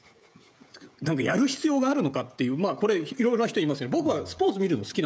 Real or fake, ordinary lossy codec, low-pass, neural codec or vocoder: fake; none; none; codec, 16 kHz, 16 kbps, FreqCodec, smaller model